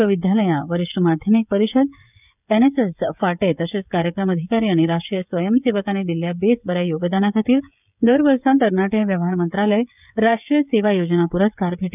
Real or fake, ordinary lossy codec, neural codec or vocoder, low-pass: fake; none; codec, 16 kHz, 16 kbps, FreqCodec, smaller model; 3.6 kHz